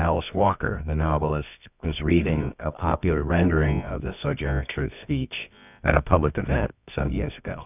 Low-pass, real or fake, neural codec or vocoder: 3.6 kHz; fake; codec, 24 kHz, 0.9 kbps, WavTokenizer, medium music audio release